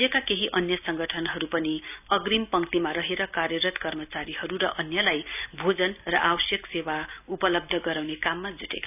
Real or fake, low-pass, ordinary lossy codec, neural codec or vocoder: real; 3.6 kHz; none; none